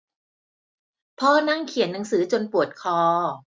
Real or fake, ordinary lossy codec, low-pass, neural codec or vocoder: real; none; none; none